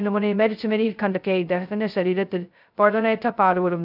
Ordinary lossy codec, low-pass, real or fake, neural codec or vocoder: none; 5.4 kHz; fake; codec, 16 kHz, 0.2 kbps, FocalCodec